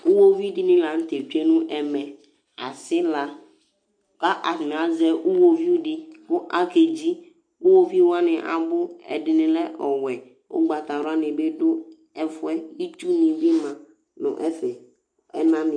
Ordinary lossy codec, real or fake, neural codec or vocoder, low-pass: MP3, 64 kbps; real; none; 9.9 kHz